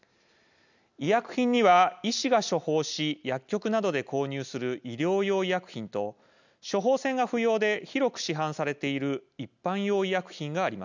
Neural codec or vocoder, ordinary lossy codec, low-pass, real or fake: none; none; 7.2 kHz; real